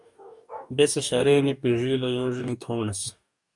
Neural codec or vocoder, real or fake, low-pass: codec, 44.1 kHz, 2.6 kbps, DAC; fake; 10.8 kHz